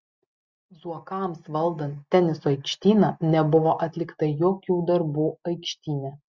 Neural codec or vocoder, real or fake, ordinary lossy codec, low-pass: none; real; Opus, 64 kbps; 7.2 kHz